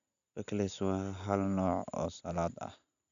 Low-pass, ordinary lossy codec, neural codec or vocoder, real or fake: 7.2 kHz; MP3, 96 kbps; none; real